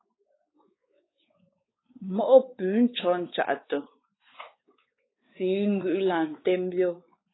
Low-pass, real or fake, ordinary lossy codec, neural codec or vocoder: 7.2 kHz; fake; AAC, 16 kbps; codec, 16 kHz, 4 kbps, X-Codec, WavLM features, trained on Multilingual LibriSpeech